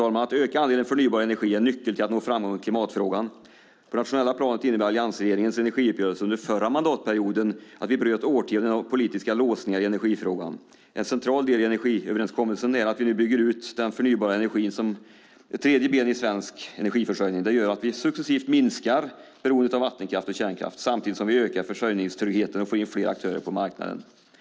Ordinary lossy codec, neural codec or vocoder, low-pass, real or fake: none; none; none; real